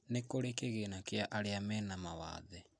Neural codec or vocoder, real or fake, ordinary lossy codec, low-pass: none; real; AAC, 64 kbps; 9.9 kHz